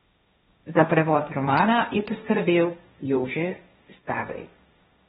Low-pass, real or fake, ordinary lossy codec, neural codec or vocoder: 7.2 kHz; fake; AAC, 16 kbps; codec, 16 kHz, 1.1 kbps, Voila-Tokenizer